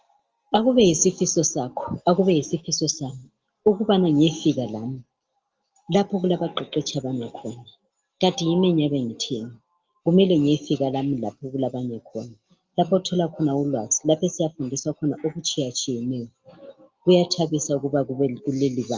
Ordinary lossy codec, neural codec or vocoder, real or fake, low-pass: Opus, 24 kbps; none; real; 7.2 kHz